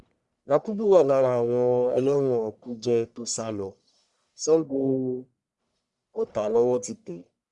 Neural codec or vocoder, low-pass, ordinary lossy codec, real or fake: codec, 44.1 kHz, 1.7 kbps, Pupu-Codec; 10.8 kHz; Opus, 64 kbps; fake